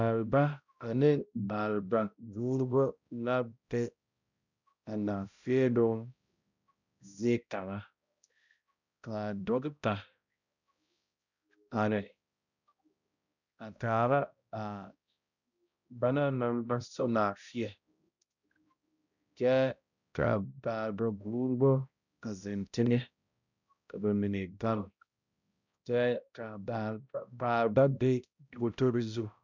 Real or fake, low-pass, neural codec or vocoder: fake; 7.2 kHz; codec, 16 kHz, 0.5 kbps, X-Codec, HuBERT features, trained on balanced general audio